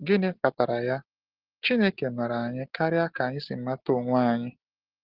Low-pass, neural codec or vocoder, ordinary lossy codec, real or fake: 5.4 kHz; none; Opus, 16 kbps; real